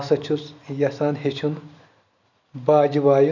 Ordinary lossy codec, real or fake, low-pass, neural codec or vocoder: none; real; 7.2 kHz; none